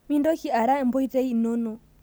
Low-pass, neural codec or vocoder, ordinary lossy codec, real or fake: none; none; none; real